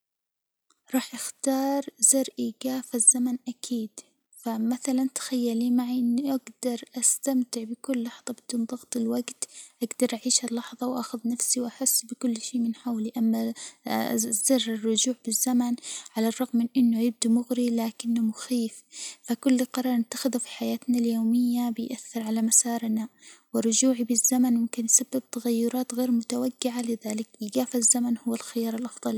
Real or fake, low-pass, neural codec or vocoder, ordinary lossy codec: real; none; none; none